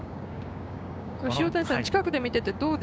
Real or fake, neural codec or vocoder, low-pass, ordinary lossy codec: fake; codec, 16 kHz, 6 kbps, DAC; none; none